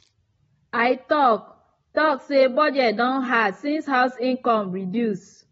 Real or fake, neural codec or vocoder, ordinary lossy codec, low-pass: real; none; AAC, 24 kbps; 10.8 kHz